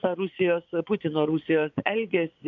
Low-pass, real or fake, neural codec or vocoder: 7.2 kHz; fake; vocoder, 24 kHz, 100 mel bands, Vocos